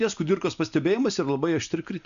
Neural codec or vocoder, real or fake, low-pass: none; real; 7.2 kHz